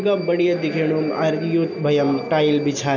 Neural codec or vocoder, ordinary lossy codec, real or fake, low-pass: none; none; real; 7.2 kHz